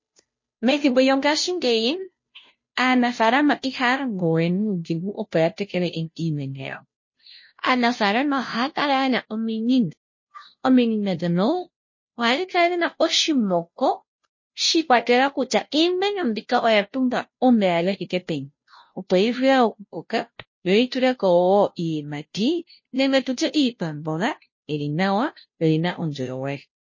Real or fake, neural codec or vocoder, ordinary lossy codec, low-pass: fake; codec, 16 kHz, 0.5 kbps, FunCodec, trained on Chinese and English, 25 frames a second; MP3, 32 kbps; 7.2 kHz